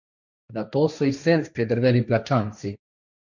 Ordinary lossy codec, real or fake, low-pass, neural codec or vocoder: none; fake; 7.2 kHz; codec, 16 kHz, 1.1 kbps, Voila-Tokenizer